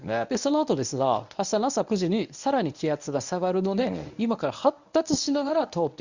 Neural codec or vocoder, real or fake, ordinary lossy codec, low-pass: codec, 24 kHz, 0.9 kbps, WavTokenizer, medium speech release version 1; fake; Opus, 64 kbps; 7.2 kHz